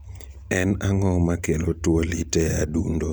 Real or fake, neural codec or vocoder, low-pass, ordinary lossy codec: real; none; none; none